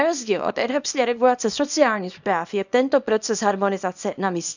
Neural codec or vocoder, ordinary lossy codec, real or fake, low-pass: codec, 24 kHz, 0.9 kbps, WavTokenizer, small release; none; fake; 7.2 kHz